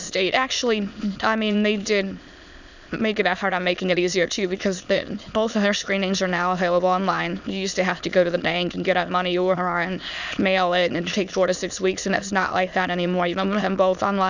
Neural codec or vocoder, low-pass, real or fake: autoencoder, 22.05 kHz, a latent of 192 numbers a frame, VITS, trained on many speakers; 7.2 kHz; fake